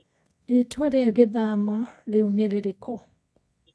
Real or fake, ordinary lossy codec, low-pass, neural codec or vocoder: fake; none; none; codec, 24 kHz, 0.9 kbps, WavTokenizer, medium music audio release